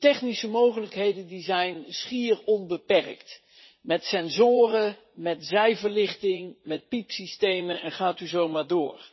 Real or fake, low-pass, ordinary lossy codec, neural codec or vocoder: fake; 7.2 kHz; MP3, 24 kbps; vocoder, 44.1 kHz, 80 mel bands, Vocos